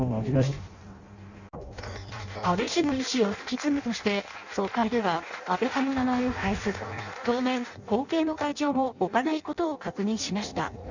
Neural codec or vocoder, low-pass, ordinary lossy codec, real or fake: codec, 16 kHz in and 24 kHz out, 0.6 kbps, FireRedTTS-2 codec; 7.2 kHz; none; fake